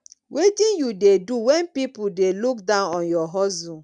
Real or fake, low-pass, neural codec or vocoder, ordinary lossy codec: real; 9.9 kHz; none; none